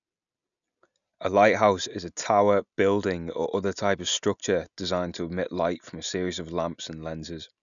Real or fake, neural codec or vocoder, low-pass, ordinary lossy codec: real; none; 7.2 kHz; none